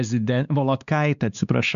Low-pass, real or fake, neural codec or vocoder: 7.2 kHz; fake; codec, 16 kHz, 2 kbps, X-Codec, WavLM features, trained on Multilingual LibriSpeech